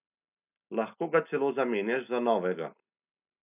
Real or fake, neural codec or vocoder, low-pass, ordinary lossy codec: real; none; 3.6 kHz; none